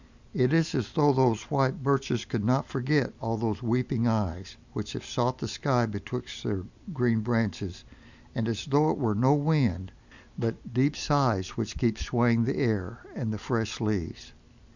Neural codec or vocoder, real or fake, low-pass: none; real; 7.2 kHz